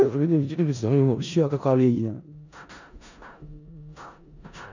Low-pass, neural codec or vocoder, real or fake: 7.2 kHz; codec, 16 kHz in and 24 kHz out, 0.4 kbps, LongCat-Audio-Codec, four codebook decoder; fake